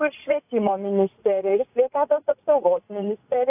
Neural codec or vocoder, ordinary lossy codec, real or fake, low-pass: vocoder, 44.1 kHz, 80 mel bands, Vocos; AAC, 32 kbps; fake; 3.6 kHz